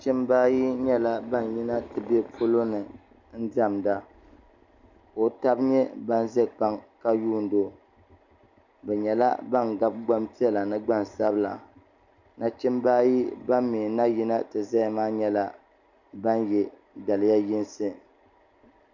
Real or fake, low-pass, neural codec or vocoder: real; 7.2 kHz; none